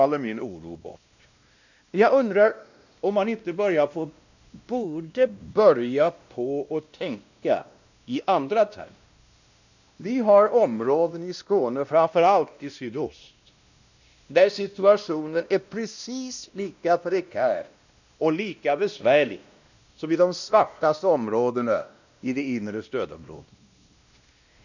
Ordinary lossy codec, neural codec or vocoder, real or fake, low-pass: none; codec, 16 kHz, 1 kbps, X-Codec, WavLM features, trained on Multilingual LibriSpeech; fake; 7.2 kHz